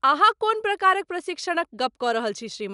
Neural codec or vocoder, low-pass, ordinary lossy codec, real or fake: none; 10.8 kHz; none; real